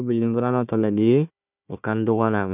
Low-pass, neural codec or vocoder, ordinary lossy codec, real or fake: 3.6 kHz; codec, 16 kHz, 1 kbps, FunCodec, trained on Chinese and English, 50 frames a second; none; fake